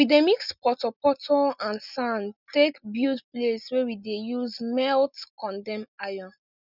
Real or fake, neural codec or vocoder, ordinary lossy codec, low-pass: real; none; none; 5.4 kHz